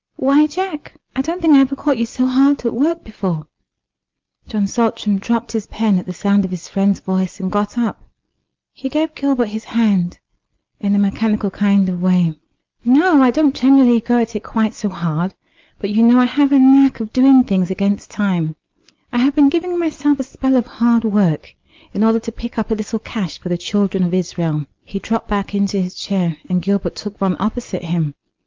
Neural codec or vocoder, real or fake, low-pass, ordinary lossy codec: none; real; 7.2 kHz; Opus, 32 kbps